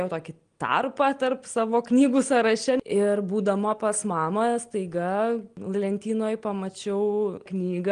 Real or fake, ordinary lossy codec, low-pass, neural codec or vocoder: real; Opus, 24 kbps; 9.9 kHz; none